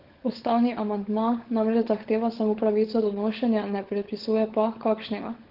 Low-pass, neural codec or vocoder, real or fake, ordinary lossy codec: 5.4 kHz; codec, 16 kHz, 16 kbps, FunCodec, trained on LibriTTS, 50 frames a second; fake; Opus, 16 kbps